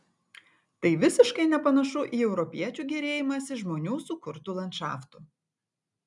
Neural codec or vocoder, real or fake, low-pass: none; real; 10.8 kHz